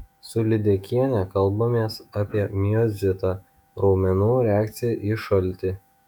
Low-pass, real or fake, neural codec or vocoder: 19.8 kHz; fake; autoencoder, 48 kHz, 128 numbers a frame, DAC-VAE, trained on Japanese speech